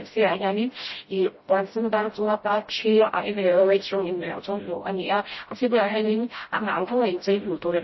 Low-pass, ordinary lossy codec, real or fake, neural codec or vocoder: 7.2 kHz; MP3, 24 kbps; fake; codec, 16 kHz, 0.5 kbps, FreqCodec, smaller model